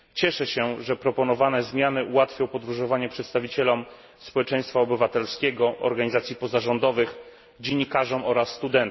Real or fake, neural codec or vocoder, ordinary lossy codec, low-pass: real; none; MP3, 24 kbps; 7.2 kHz